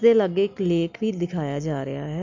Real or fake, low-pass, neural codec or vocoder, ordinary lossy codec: real; 7.2 kHz; none; MP3, 64 kbps